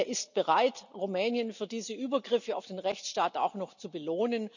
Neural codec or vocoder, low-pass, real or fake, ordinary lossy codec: none; 7.2 kHz; real; none